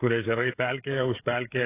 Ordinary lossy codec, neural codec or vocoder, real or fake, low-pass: AAC, 16 kbps; codec, 16 kHz, 16 kbps, FreqCodec, smaller model; fake; 3.6 kHz